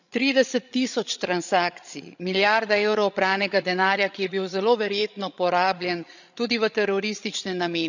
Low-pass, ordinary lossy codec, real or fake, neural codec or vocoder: 7.2 kHz; none; fake; codec, 16 kHz, 16 kbps, FreqCodec, larger model